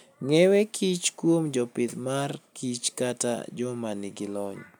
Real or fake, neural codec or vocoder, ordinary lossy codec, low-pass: real; none; none; none